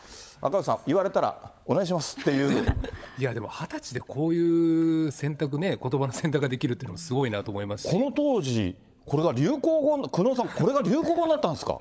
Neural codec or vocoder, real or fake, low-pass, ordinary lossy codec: codec, 16 kHz, 16 kbps, FunCodec, trained on LibriTTS, 50 frames a second; fake; none; none